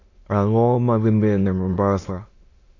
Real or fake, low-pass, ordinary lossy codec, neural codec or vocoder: fake; 7.2 kHz; AAC, 32 kbps; autoencoder, 22.05 kHz, a latent of 192 numbers a frame, VITS, trained on many speakers